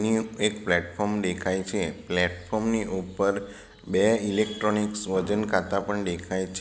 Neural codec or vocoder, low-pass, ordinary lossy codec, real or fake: none; none; none; real